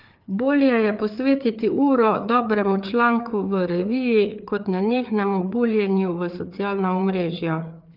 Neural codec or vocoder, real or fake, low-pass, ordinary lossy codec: codec, 16 kHz, 4 kbps, FreqCodec, larger model; fake; 5.4 kHz; Opus, 24 kbps